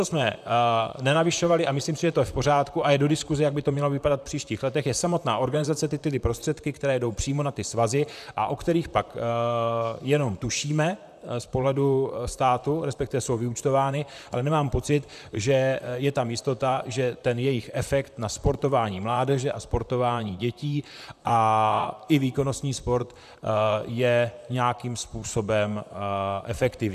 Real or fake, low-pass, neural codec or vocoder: fake; 14.4 kHz; vocoder, 44.1 kHz, 128 mel bands, Pupu-Vocoder